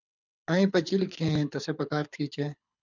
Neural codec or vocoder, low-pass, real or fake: codec, 24 kHz, 6 kbps, HILCodec; 7.2 kHz; fake